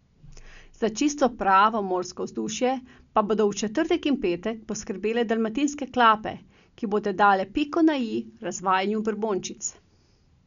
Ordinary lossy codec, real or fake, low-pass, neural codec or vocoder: none; real; 7.2 kHz; none